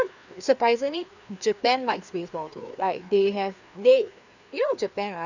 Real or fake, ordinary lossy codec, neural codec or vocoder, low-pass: fake; none; codec, 16 kHz, 2 kbps, FreqCodec, larger model; 7.2 kHz